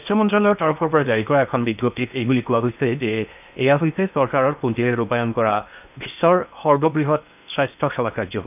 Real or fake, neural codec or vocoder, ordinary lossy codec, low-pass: fake; codec, 16 kHz in and 24 kHz out, 0.8 kbps, FocalCodec, streaming, 65536 codes; none; 3.6 kHz